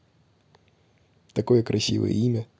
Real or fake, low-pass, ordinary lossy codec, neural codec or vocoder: real; none; none; none